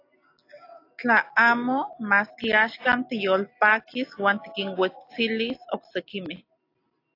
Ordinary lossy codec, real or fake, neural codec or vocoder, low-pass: AAC, 32 kbps; real; none; 5.4 kHz